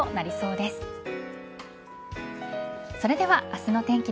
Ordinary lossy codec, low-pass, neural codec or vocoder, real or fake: none; none; none; real